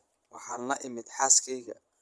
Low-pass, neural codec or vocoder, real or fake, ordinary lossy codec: none; vocoder, 22.05 kHz, 80 mel bands, Vocos; fake; none